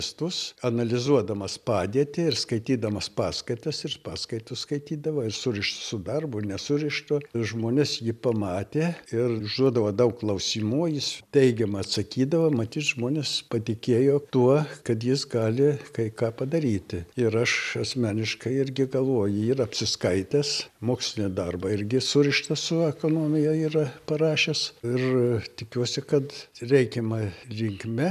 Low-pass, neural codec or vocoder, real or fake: 14.4 kHz; none; real